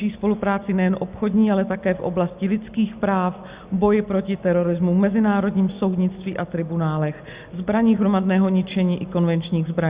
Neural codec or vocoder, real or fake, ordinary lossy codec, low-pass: none; real; Opus, 64 kbps; 3.6 kHz